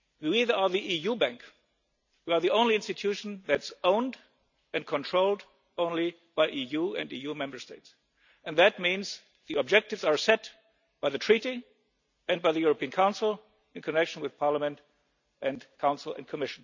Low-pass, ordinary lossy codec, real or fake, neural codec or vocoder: 7.2 kHz; none; real; none